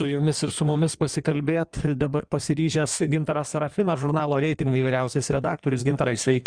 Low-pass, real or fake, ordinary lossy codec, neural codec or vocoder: 9.9 kHz; fake; Opus, 64 kbps; codec, 16 kHz in and 24 kHz out, 1.1 kbps, FireRedTTS-2 codec